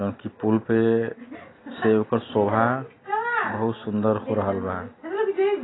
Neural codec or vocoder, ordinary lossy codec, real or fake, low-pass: none; AAC, 16 kbps; real; 7.2 kHz